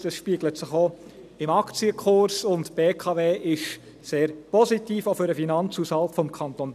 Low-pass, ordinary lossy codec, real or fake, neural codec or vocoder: 14.4 kHz; none; real; none